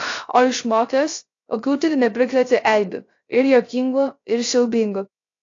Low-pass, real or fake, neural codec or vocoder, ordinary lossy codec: 7.2 kHz; fake; codec, 16 kHz, 0.3 kbps, FocalCodec; AAC, 48 kbps